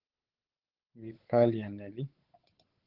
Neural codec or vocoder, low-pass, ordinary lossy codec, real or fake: codec, 16 kHz, 8 kbps, FunCodec, trained on Chinese and English, 25 frames a second; 5.4 kHz; Opus, 16 kbps; fake